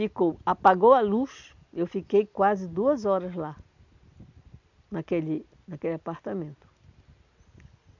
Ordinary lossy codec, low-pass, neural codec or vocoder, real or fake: none; 7.2 kHz; none; real